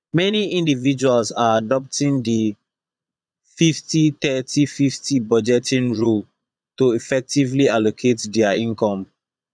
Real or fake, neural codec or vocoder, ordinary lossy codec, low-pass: fake; vocoder, 22.05 kHz, 80 mel bands, Vocos; none; 9.9 kHz